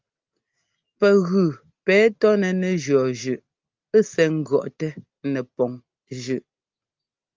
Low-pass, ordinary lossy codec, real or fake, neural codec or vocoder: 7.2 kHz; Opus, 24 kbps; real; none